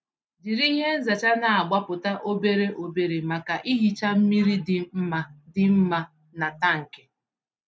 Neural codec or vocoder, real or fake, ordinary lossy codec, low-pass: none; real; none; none